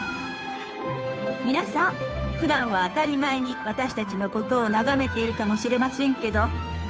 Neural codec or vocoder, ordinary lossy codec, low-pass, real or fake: codec, 16 kHz, 2 kbps, FunCodec, trained on Chinese and English, 25 frames a second; none; none; fake